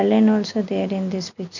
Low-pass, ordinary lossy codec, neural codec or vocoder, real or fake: 7.2 kHz; none; none; real